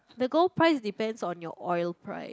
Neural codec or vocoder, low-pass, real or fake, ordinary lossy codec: none; none; real; none